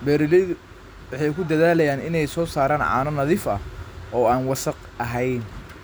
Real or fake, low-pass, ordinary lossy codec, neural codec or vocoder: real; none; none; none